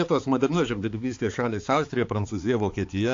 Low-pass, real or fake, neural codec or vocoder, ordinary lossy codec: 7.2 kHz; fake; codec, 16 kHz, 4 kbps, X-Codec, HuBERT features, trained on balanced general audio; AAC, 48 kbps